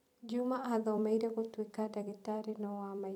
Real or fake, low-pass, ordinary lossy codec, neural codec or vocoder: fake; 19.8 kHz; none; vocoder, 44.1 kHz, 128 mel bands every 256 samples, BigVGAN v2